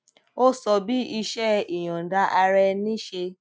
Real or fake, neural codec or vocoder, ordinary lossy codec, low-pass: real; none; none; none